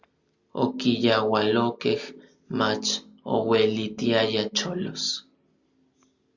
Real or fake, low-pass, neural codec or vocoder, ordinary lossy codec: real; 7.2 kHz; none; Opus, 64 kbps